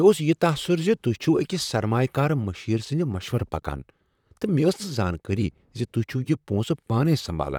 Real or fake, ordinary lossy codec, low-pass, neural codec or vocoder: fake; none; 19.8 kHz; vocoder, 44.1 kHz, 128 mel bands, Pupu-Vocoder